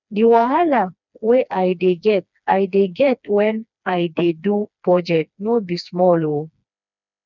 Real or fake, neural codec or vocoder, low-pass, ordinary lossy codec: fake; codec, 16 kHz, 2 kbps, FreqCodec, smaller model; 7.2 kHz; none